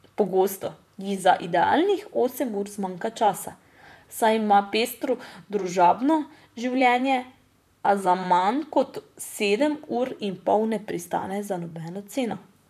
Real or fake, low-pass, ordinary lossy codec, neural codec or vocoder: fake; 14.4 kHz; none; vocoder, 44.1 kHz, 128 mel bands, Pupu-Vocoder